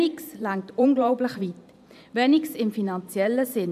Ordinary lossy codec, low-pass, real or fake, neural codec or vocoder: none; 14.4 kHz; fake; vocoder, 44.1 kHz, 128 mel bands every 512 samples, BigVGAN v2